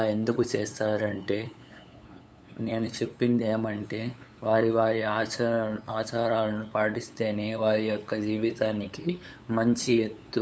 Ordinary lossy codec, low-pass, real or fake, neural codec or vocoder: none; none; fake; codec, 16 kHz, 8 kbps, FunCodec, trained on LibriTTS, 25 frames a second